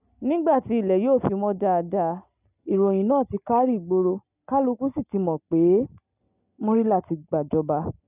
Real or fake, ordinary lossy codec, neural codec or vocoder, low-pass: real; none; none; 3.6 kHz